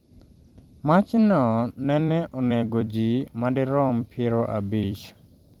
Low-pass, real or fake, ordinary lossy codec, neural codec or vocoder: 19.8 kHz; fake; Opus, 24 kbps; vocoder, 44.1 kHz, 128 mel bands every 256 samples, BigVGAN v2